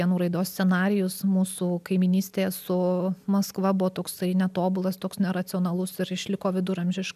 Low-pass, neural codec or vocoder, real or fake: 14.4 kHz; none; real